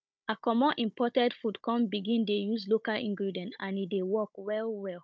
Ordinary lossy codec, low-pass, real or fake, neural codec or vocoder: none; none; fake; codec, 16 kHz, 16 kbps, FunCodec, trained on Chinese and English, 50 frames a second